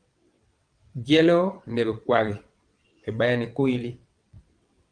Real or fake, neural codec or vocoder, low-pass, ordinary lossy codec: fake; vocoder, 22.05 kHz, 80 mel bands, WaveNeXt; 9.9 kHz; Opus, 32 kbps